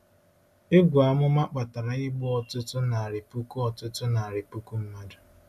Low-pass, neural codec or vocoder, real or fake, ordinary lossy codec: 14.4 kHz; none; real; MP3, 96 kbps